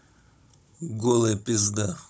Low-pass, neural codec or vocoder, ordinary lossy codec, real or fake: none; codec, 16 kHz, 16 kbps, FunCodec, trained on Chinese and English, 50 frames a second; none; fake